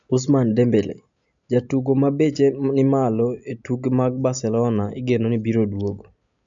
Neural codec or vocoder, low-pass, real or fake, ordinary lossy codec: none; 7.2 kHz; real; MP3, 64 kbps